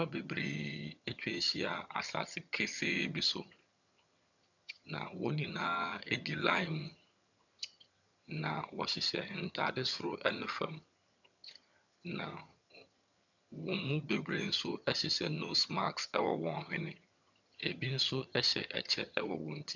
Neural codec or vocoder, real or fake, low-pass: vocoder, 22.05 kHz, 80 mel bands, HiFi-GAN; fake; 7.2 kHz